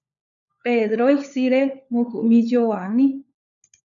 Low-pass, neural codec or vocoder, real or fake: 7.2 kHz; codec, 16 kHz, 4 kbps, FunCodec, trained on LibriTTS, 50 frames a second; fake